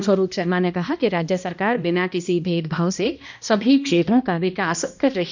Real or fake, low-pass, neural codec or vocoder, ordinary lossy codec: fake; 7.2 kHz; codec, 16 kHz, 1 kbps, X-Codec, HuBERT features, trained on balanced general audio; none